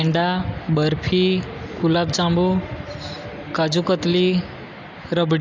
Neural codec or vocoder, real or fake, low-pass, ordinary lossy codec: none; real; 7.2 kHz; AAC, 48 kbps